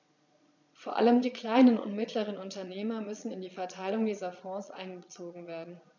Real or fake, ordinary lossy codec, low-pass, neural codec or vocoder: fake; none; 7.2 kHz; vocoder, 22.05 kHz, 80 mel bands, Vocos